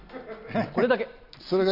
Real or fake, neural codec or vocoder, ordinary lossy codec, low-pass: real; none; none; 5.4 kHz